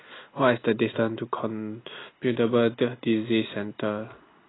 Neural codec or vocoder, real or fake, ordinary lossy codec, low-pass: none; real; AAC, 16 kbps; 7.2 kHz